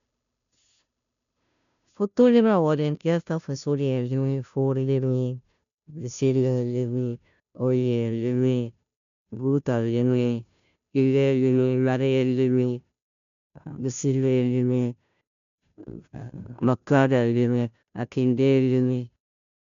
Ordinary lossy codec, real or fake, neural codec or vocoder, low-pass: none; fake; codec, 16 kHz, 0.5 kbps, FunCodec, trained on Chinese and English, 25 frames a second; 7.2 kHz